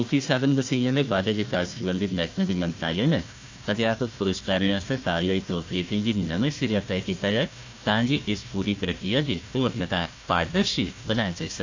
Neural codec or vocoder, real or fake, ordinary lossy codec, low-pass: codec, 16 kHz, 1 kbps, FunCodec, trained on Chinese and English, 50 frames a second; fake; AAC, 48 kbps; 7.2 kHz